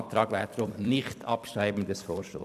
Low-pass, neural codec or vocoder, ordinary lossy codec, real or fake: 14.4 kHz; vocoder, 44.1 kHz, 128 mel bands every 256 samples, BigVGAN v2; none; fake